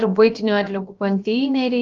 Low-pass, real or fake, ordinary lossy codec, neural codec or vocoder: 7.2 kHz; fake; Opus, 24 kbps; codec, 16 kHz, about 1 kbps, DyCAST, with the encoder's durations